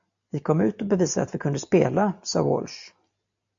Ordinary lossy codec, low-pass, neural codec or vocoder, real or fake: AAC, 64 kbps; 7.2 kHz; none; real